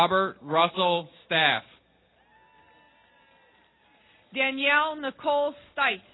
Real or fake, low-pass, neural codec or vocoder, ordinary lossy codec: real; 7.2 kHz; none; AAC, 16 kbps